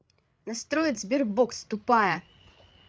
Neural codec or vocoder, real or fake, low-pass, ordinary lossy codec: codec, 16 kHz, 8 kbps, FreqCodec, larger model; fake; none; none